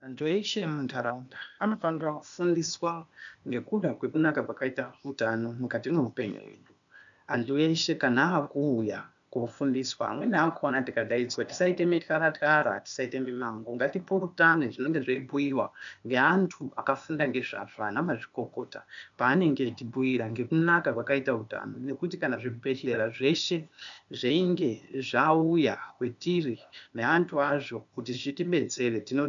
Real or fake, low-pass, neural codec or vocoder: fake; 7.2 kHz; codec, 16 kHz, 0.8 kbps, ZipCodec